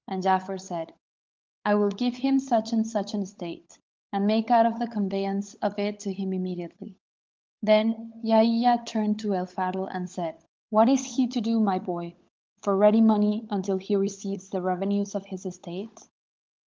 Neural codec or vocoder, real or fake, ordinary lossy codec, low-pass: codec, 16 kHz, 16 kbps, FunCodec, trained on LibriTTS, 50 frames a second; fake; Opus, 24 kbps; 7.2 kHz